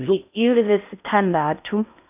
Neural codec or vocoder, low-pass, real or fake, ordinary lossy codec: codec, 16 kHz in and 24 kHz out, 0.6 kbps, FocalCodec, streaming, 4096 codes; 3.6 kHz; fake; none